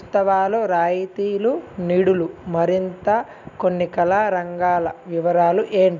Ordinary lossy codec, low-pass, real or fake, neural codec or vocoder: none; 7.2 kHz; real; none